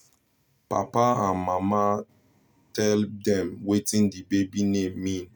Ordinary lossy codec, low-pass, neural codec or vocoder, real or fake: none; none; none; real